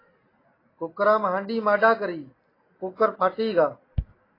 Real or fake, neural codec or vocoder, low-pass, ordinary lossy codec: real; none; 5.4 kHz; AAC, 24 kbps